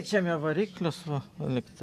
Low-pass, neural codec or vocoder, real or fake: 14.4 kHz; none; real